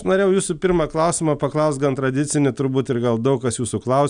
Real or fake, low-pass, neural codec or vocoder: real; 9.9 kHz; none